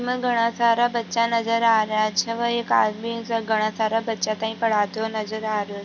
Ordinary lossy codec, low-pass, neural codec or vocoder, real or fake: none; 7.2 kHz; none; real